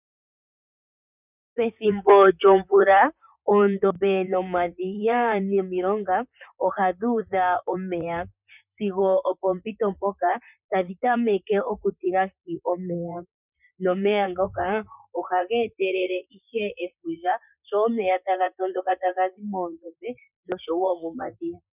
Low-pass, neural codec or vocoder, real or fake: 3.6 kHz; vocoder, 44.1 kHz, 128 mel bands, Pupu-Vocoder; fake